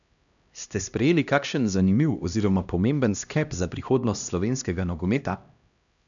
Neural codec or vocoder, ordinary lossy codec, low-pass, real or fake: codec, 16 kHz, 1 kbps, X-Codec, HuBERT features, trained on LibriSpeech; none; 7.2 kHz; fake